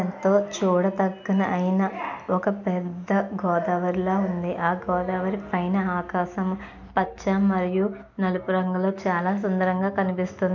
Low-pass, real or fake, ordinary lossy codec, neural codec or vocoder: 7.2 kHz; real; MP3, 64 kbps; none